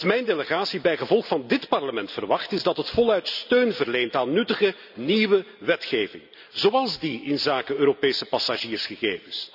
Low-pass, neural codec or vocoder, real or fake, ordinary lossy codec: 5.4 kHz; none; real; none